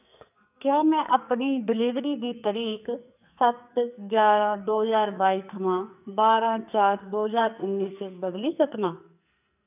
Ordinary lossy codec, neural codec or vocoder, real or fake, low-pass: none; codec, 44.1 kHz, 2.6 kbps, SNAC; fake; 3.6 kHz